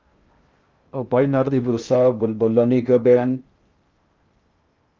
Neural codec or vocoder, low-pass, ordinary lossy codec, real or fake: codec, 16 kHz in and 24 kHz out, 0.6 kbps, FocalCodec, streaming, 2048 codes; 7.2 kHz; Opus, 32 kbps; fake